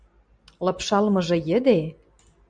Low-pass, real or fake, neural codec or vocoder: 9.9 kHz; real; none